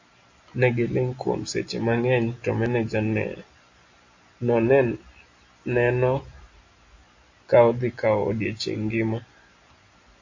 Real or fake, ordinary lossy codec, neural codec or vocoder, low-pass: real; AAC, 48 kbps; none; 7.2 kHz